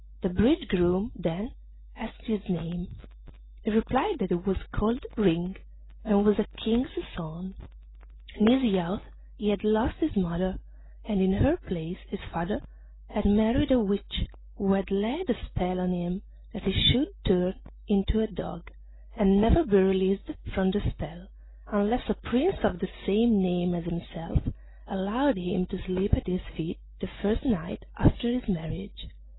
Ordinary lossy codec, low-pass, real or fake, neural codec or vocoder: AAC, 16 kbps; 7.2 kHz; real; none